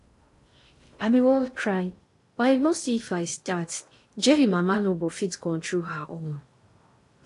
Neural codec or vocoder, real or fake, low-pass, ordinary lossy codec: codec, 16 kHz in and 24 kHz out, 0.6 kbps, FocalCodec, streaming, 2048 codes; fake; 10.8 kHz; AAC, 48 kbps